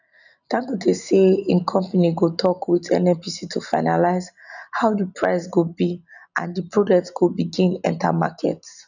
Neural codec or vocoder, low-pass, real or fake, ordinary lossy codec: none; 7.2 kHz; real; none